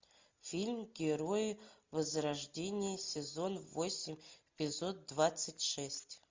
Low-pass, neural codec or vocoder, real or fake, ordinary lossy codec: 7.2 kHz; none; real; MP3, 64 kbps